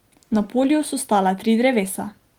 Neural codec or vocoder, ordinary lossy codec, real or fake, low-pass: none; Opus, 24 kbps; real; 19.8 kHz